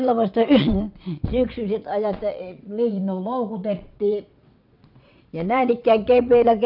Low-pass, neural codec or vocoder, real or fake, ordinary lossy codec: 5.4 kHz; vocoder, 22.05 kHz, 80 mel bands, WaveNeXt; fake; none